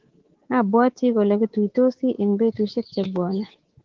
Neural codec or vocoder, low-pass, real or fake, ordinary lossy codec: codec, 24 kHz, 3.1 kbps, DualCodec; 7.2 kHz; fake; Opus, 16 kbps